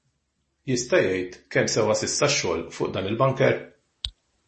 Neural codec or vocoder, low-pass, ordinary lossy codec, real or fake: none; 10.8 kHz; MP3, 32 kbps; real